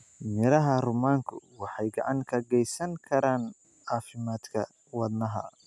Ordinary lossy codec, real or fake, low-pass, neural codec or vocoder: none; real; none; none